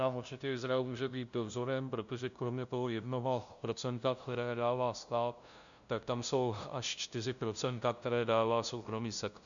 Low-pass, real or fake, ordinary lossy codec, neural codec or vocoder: 7.2 kHz; fake; MP3, 96 kbps; codec, 16 kHz, 0.5 kbps, FunCodec, trained on LibriTTS, 25 frames a second